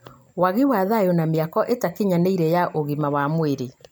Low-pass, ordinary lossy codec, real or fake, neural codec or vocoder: none; none; real; none